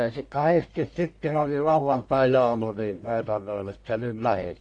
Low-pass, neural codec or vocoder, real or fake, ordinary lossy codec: 9.9 kHz; codec, 44.1 kHz, 1.7 kbps, Pupu-Codec; fake; AAC, 48 kbps